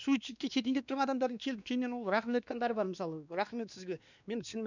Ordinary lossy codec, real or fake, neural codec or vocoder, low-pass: none; fake; codec, 16 kHz, 2 kbps, X-Codec, WavLM features, trained on Multilingual LibriSpeech; 7.2 kHz